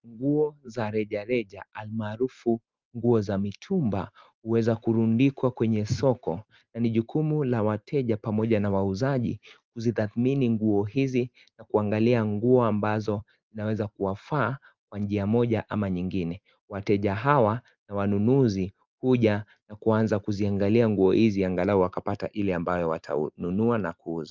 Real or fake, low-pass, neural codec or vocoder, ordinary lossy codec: real; 7.2 kHz; none; Opus, 32 kbps